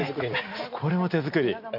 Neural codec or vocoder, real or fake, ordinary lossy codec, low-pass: none; real; none; 5.4 kHz